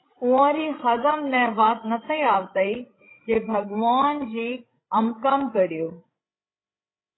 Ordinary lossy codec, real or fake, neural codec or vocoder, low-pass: AAC, 16 kbps; fake; codec, 16 kHz, 16 kbps, FreqCodec, larger model; 7.2 kHz